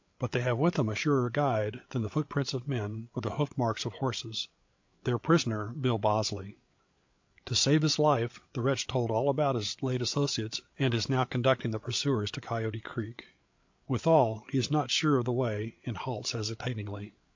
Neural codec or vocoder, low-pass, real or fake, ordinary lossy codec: codec, 16 kHz, 8 kbps, FreqCodec, larger model; 7.2 kHz; fake; MP3, 48 kbps